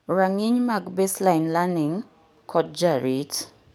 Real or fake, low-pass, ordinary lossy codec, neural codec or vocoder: fake; none; none; codec, 44.1 kHz, 7.8 kbps, DAC